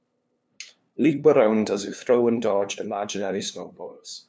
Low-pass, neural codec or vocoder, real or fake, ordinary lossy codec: none; codec, 16 kHz, 2 kbps, FunCodec, trained on LibriTTS, 25 frames a second; fake; none